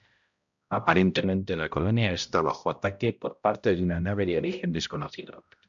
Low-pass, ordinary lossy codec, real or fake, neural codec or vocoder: 7.2 kHz; MP3, 64 kbps; fake; codec, 16 kHz, 0.5 kbps, X-Codec, HuBERT features, trained on balanced general audio